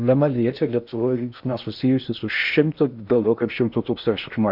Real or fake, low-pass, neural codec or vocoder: fake; 5.4 kHz; codec, 16 kHz in and 24 kHz out, 0.6 kbps, FocalCodec, streaming, 2048 codes